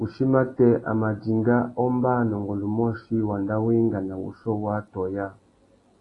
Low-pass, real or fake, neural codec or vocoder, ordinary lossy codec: 10.8 kHz; real; none; AAC, 32 kbps